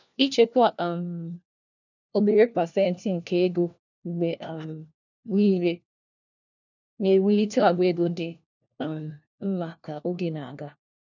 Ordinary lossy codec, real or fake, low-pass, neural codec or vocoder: none; fake; 7.2 kHz; codec, 16 kHz, 1 kbps, FunCodec, trained on LibriTTS, 50 frames a second